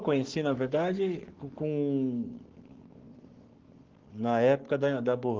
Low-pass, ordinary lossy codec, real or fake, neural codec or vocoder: 7.2 kHz; Opus, 16 kbps; fake; codec, 44.1 kHz, 7.8 kbps, Pupu-Codec